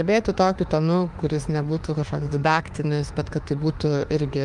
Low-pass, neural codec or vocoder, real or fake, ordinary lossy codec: 10.8 kHz; autoencoder, 48 kHz, 32 numbers a frame, DAC-VAE, trained on Japanese speech; fake; Opus, 32 kbps